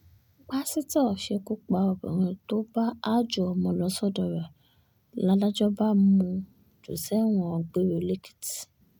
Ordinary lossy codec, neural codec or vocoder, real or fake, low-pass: none; none; real; 19.8 kHz